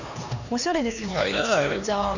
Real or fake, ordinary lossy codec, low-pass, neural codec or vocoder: fake; none; 7.2 kHz; codec, 16 kHz, 2 kbps, X-Codec, HuBERT features, trained on LibriSpeech